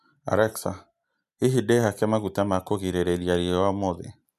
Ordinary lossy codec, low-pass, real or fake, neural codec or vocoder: none; 14.4 kHz; real; none